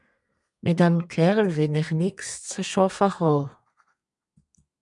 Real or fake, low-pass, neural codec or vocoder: fake; 10.8 kHz; codec, 32 kHz, 1.9 kbps, SNAC